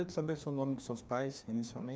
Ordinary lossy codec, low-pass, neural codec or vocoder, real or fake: none; none; codec, 16 kHz, 2 kbps, FreqCodec, larger model; fake